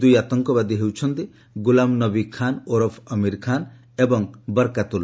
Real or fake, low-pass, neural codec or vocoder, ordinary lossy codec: real; none; none; none